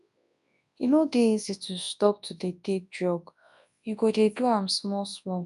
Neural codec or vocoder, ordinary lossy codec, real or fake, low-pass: codec, 24 kHz, 0.9 kbps, WavTokenizer, large speech release; none; fake; 10.8 kHz